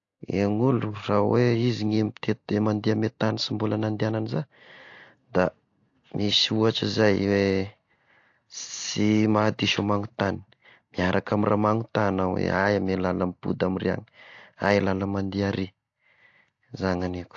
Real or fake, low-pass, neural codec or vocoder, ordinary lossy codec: real; 7.2 kHz; none; AAC, 48 kbps